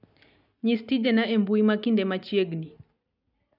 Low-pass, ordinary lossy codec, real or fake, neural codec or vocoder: 5.4 kHz; none; real; none